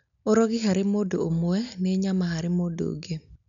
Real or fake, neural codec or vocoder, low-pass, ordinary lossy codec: real; none; 7.2 kHz; MP3, 96 kbps